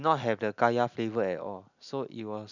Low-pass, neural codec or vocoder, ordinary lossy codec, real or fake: 7.2 kHz; none; none; real